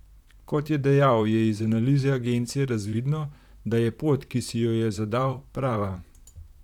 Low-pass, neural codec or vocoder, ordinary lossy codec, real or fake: 19.8 kHz; vocoder, 44.1 kHz, 128 mel bands, Pupu-Vocoder; none; fake